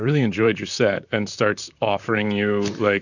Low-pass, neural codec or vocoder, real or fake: 7.2 kHz; vocoder, 44.1 kHz, 128 mel bands, Pupu-Vocoder; fake